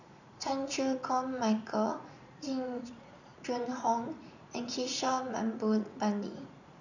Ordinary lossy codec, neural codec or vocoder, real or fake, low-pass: none; vocoder, 44.1 kHz, 80 mel bands, Vocos; fake; 7.2 kHz